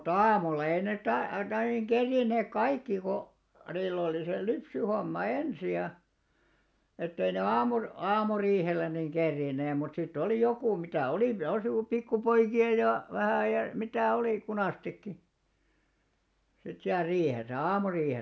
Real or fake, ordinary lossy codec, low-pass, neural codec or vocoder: real; none; none; none